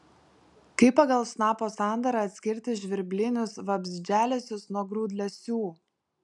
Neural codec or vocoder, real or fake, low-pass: none; real; 10.8 kHz